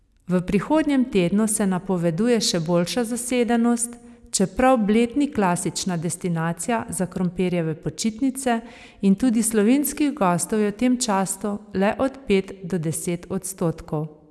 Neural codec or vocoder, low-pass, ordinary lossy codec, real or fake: none; none; none; real